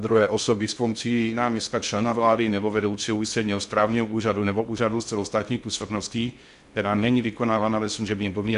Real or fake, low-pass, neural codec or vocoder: fake; 10.8 kHz; codec, 16 kHz in and 24 kHz out, 0.6 kbps, FocalCodec, streaming, 2048 codes